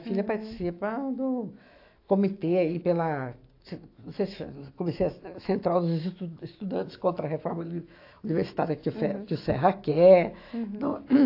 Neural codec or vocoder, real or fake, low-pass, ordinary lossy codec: autoencoder, 48 kHz, 128 numbers a frame, DAC-VAE, trained on Japanese speech; fake; 5.4 kHz; AAC, 32 kbps